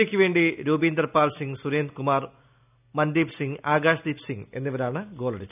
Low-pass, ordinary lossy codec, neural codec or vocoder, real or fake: 3.6 kHz; none; none; real